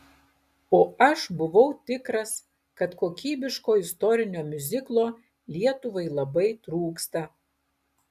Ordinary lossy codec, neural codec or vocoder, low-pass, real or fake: AAC, 96 kbps; none; 14.4 kHz; real